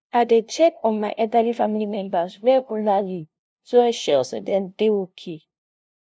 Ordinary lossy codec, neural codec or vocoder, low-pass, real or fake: none; codec, 16 kHz, 0.5 kbps, FunCodec, trained on LibriTTS, 25 frames a second; none; fake